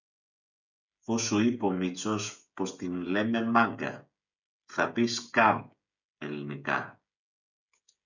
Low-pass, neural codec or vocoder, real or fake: 7.2 kHz; codec, 16 kHz, 16 kbps, FreqCodec, smaller model; fake